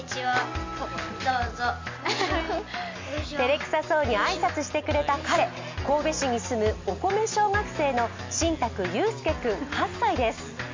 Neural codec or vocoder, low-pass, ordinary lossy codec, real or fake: none; 7.2 kHz; MP3, 48 kbps; real